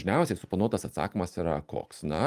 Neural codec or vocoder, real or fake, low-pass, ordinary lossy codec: none; real; 14.4 kHz; Opus, 32 kbps